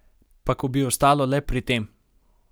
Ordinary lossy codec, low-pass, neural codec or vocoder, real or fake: none; none; none; real